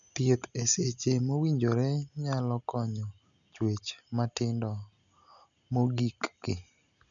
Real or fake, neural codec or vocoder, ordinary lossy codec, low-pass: real; none; none; 7.2 kHz